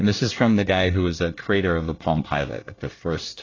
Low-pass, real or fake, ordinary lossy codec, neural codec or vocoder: 7.2 kHz; fake; AAC, 32 kbps; codec, 24 kHz, 1 kbps, SNAC